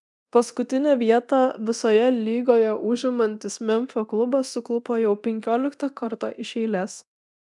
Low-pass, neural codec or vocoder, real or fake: 10.8 kHz; codec, 24 kHz, 0.9 kbps, DualCodec; fake